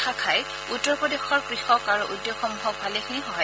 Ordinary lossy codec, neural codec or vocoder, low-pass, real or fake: none; none; none; real